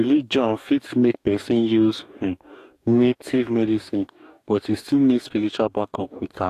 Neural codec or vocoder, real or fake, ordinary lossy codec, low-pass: codec, 44.1 kHz, 2.6 kbps, DAC; fake; AAC, 64 kbps; 14.4 kHz